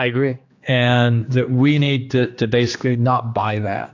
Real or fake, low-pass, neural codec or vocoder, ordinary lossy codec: fake; 7.2 kHz; codec, 16 kHz, 2 kbps, X-Codec, HuBERT features, trained on general audio; AAC, 48 kbps